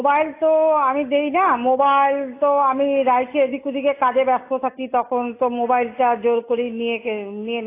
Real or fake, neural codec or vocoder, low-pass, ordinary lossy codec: real; none; 3.6 kHz; AAC, 24 kbps